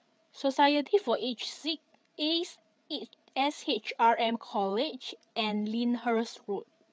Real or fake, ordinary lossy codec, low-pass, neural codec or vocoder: fake; none; none; codec, 16 kHz, 8 kbps, FreqCodec, larger model